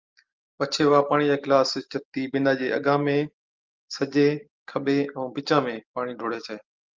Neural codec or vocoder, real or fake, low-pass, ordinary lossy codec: none; real; 7.2 kHz; Opus, 32 kbps